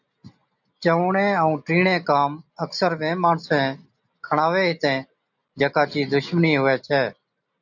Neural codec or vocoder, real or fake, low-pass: none; real; 7.2 kHz